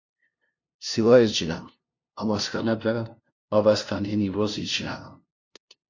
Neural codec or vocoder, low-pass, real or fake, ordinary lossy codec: codec, 16 kHz, 0.5 kbps, FunCodec, trained on LibriTTS, 25 frames a second; 7.2 kHz; fake; AAC, 48 kbps